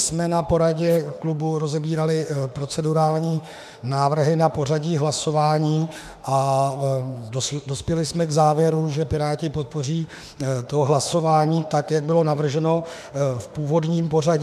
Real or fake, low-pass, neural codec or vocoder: fake; 14.4 kHz; autoencoder, 48 kHz, 32 numbers a frame, DAC-VAE, trained on Japanese speech